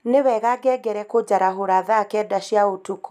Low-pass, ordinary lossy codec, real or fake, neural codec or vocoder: 14.4 kHz; none; real; none